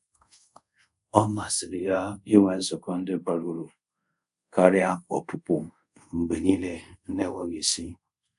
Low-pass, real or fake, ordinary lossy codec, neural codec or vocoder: 10.8 kHz; fake; none; codec, 24 kHz, 0.5 kbps, DualCodec